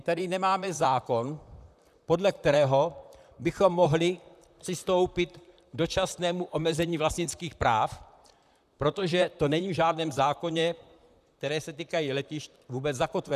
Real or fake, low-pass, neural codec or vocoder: fake; 14.4 kHz; vocoder, 44.1 kHz, 128 mel bands, Pupu-Vocoder